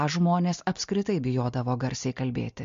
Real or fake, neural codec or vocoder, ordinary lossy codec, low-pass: real; none; MP3, 48 kbps; 7.2 kHz